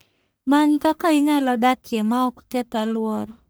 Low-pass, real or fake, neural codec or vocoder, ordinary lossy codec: none; fake; codec, 44.1 kHz, 1.7 kbps, Pupu-Codec; none